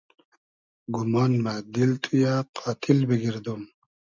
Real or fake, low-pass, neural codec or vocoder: real; 7.2 kHz; none